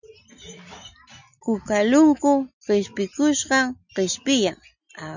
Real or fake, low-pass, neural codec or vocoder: real; 7.2 kHz; none